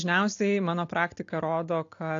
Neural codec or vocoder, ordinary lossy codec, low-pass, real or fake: none; AAC, 48 kbps; 7.2 kHz; real